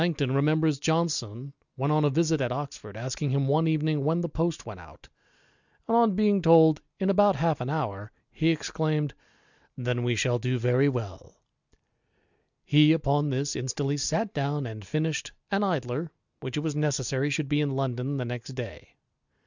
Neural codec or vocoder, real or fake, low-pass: none; real; 7.2 kHz